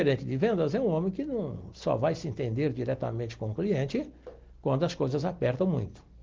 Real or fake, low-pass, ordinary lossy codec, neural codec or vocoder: real; 7.2 kHz; Opus, 16 kbps; none